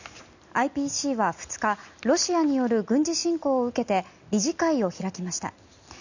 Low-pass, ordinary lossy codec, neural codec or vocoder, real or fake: 7.2 kHz; none; none; real